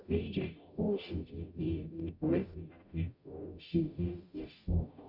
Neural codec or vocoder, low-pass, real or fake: codec, 44.1 kHz, 0.9 kbps, DAC; 5.4 kHz; fake